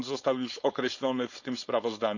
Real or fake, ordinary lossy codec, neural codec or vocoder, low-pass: fake; none; codec, 16 kHz, 4.8 kbps, FACodec; 7.2 kHz